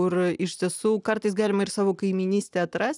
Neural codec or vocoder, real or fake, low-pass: none; real; 10.8 kHz